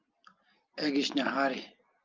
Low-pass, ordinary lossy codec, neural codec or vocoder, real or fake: 7.2 kHz; Opus, 24 kbps; none; real